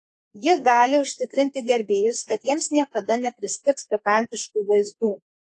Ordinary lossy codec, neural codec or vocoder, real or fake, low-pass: AAC, 48 kbps; codec, 32 kHz, 1.9 kbps, SNAC; fake; 10.8 kHz